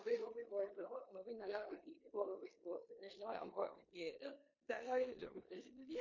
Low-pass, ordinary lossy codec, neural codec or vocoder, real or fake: 7.2 kHz; MP3, 32 kbps; codec, 16 kHz in and 24 kHz out, 0.9 kbps, LongCat-Audio-Codec, four codebook decoder; fake